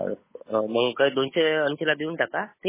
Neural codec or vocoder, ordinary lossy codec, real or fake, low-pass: codec, 16 kHz, 8 kbps, FunCodec, trained on Chinese and English, 25 frames a second; MP3, 16 kbps; fake; 3.6 kHz